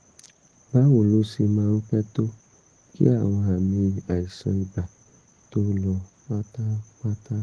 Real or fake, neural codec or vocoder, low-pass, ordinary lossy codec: real; none; 9.9 kHz; Opus, 16 kbps